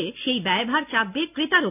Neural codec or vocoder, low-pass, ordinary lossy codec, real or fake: none; 3.6 kHz; none; real